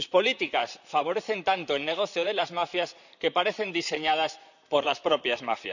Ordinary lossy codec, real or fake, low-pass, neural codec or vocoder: none; fake; 7.2 kHz; vocoder, 44.1 kHz, 128 mel bands, Pupu-Vocoder